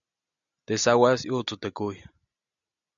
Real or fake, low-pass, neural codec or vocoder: real; 7.2 kHz; none